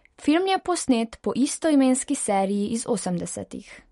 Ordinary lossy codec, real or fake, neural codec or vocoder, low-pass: MP3, 48 kbps; real; none; 19.8 kHz